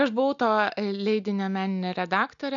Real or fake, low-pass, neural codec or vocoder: real; 7.2 kHz; none